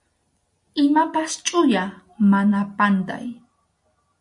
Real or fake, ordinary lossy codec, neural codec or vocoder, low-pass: real; MP3, 64 kbps; none; 10.8 kHz